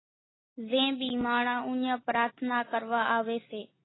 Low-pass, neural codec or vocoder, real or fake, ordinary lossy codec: 7.2 kHz; none; real; AAC, 16 kbps